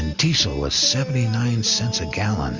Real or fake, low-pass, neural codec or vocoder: real; 7.2 kHz; none